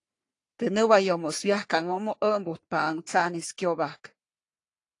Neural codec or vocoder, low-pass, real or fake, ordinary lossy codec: codec, 44.1 kHz, 3.4 kbps, Pupu-Codec; 10.8 kHz; fake; AAC, 48 kbps